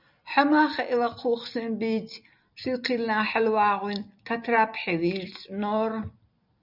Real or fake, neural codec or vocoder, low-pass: real; none; 5.4 kHz